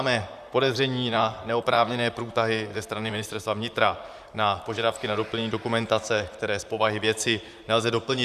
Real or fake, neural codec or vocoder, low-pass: fake; vocoder, 44.1 kHz, 128 mel bands, Pupu-Vocoder; 14.4 kHz